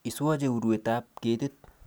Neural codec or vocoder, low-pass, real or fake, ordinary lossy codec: none; none; real; none